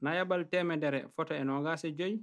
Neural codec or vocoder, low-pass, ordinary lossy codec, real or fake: none; none; none; real